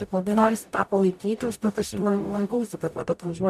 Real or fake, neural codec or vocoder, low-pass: fake; codec, 44.1 kHz, 0.9 kbps, DAC; 14.4 kHz